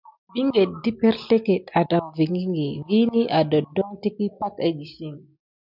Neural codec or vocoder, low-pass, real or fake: none; 5.4 kHz; real